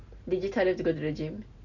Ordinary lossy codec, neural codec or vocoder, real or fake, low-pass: none; none; real; 7.2 kHz